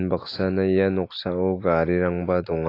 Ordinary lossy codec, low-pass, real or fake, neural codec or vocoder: AAC, 32 kbps; 5.4 kHz; real; none